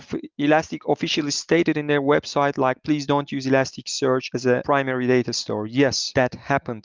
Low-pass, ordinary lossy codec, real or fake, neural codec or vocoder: 7.2 kHz; Opus, 32 kbps; real; none